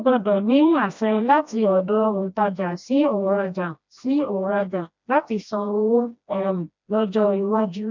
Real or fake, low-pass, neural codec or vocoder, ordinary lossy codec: fake; 7.2 kHz; codec, 16 kHz, 1 kbps, FreqCodec, smaller model; MP3, 64 kbps